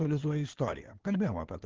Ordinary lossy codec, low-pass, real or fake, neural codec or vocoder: Opus, 16 kbps; 7.2 kHz; fake; codec, 16 kHz in and 24 kHz out, 2.2 kbps, FireRedTTS-2 codec